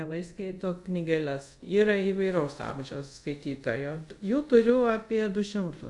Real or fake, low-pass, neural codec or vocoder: fake; 10.8 kHz; codec, 24 kHz, 0.5 kbps, DualCodec